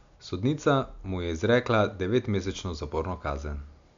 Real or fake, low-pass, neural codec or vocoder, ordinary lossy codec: real; 7.2 kHz; none; MP3, 64 kbps